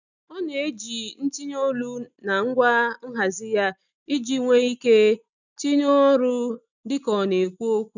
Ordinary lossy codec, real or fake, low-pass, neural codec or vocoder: none; real; 7.2 kHz; none